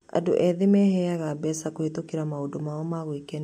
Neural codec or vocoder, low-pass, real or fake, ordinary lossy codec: none; 19.8 kHz; real; MP3, 64 kbps